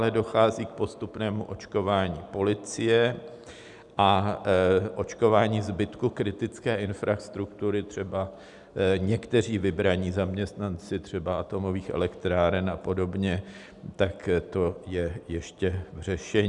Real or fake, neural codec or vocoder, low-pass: real; none; 10.8 kHz